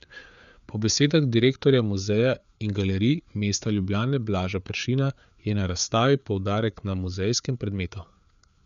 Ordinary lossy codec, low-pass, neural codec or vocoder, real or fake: none; 7.2 kHz; codec, 16 kHz, 4 kbps, FreqCodec, larger model; fake